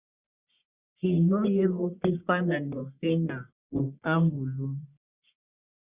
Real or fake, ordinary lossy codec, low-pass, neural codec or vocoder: fake; Opus, 64 kbps; 3.6 kHz; codec, 44.1 kHz, 1.7 kbps, Pupu-Codec